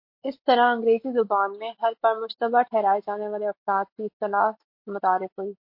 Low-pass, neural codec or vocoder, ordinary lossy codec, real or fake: 5.4 kHz; none; MP3, 32 kbps; real